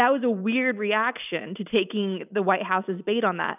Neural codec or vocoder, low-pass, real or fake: none; 3.6 kHz; real